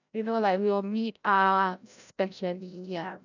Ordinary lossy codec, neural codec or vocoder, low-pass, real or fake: none; codec, 16 kHz, 0.5 kbps, FreqCodec, larger model; 7.2 kHz; fake